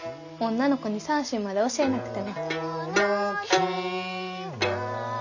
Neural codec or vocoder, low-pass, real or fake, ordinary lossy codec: none; 7.2 kHz; real; none